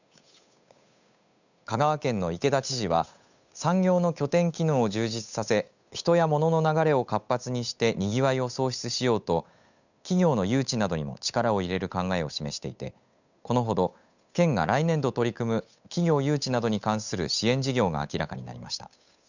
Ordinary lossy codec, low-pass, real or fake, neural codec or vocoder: none; 7.2 kHz; fake; codec, 16 kHz, 8 kbps, FunCodec, trained on Chinese and English, 25 frames a second